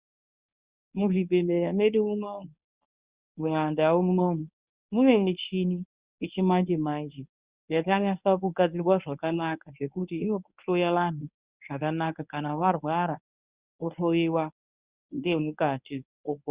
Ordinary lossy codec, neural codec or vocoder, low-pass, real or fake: Opus, 64 kbps; codec, 24 kHz, 0.9 kbps, WavTokenizer, medium speech release version 1; 3.6 kHz; fake